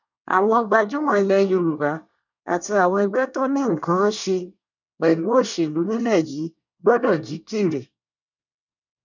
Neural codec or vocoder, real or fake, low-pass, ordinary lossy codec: codec, 24 kHz, 1 kbps, SNAC; fake; 7.2 kHz; none